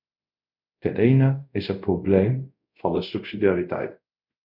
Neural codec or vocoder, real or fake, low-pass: codec, 24 kHz, 0.5 kbps, DualCodec; fake; 5.4 kHz